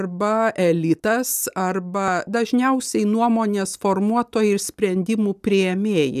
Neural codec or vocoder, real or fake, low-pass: none; real; 14.4 kHz